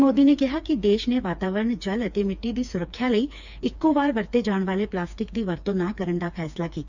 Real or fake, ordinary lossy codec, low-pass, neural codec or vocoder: fake; none; 7.2 kHz; codec, 16 kHz, 4 kbps, FreqCodec, smaller model